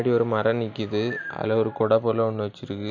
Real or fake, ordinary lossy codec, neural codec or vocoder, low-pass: real; none; none; 7.2 kHz